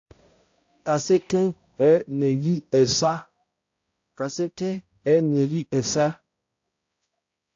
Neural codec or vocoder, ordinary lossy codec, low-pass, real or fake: codec, 16 kHz, 0.5 kbps, X-Codec, HuBERT features, trained on balanced general audio; AAC, 48 kbps; 7.2 kHz; fake